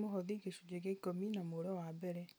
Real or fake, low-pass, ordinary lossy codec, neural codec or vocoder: real; none; none; none